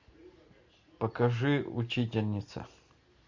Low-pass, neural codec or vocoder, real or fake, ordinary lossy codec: 7.2 kHz; none; real; MP3, 64 kbps